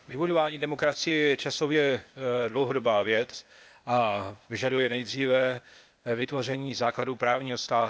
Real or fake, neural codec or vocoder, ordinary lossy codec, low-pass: fake; codec, 16 kHz, 0.8 kbps, ZipCodec; none; none